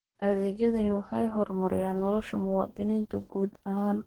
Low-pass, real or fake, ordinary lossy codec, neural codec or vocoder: 19.8 kHz; fake; Opus, 16 kbps; codec, 44.1 kHz, 2.6 kbps, DAC